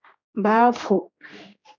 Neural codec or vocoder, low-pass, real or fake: codec, 16 kHz, 2 kbps, X-Codec, HuBERT features, trained on general audio; 7.2 kHz; fake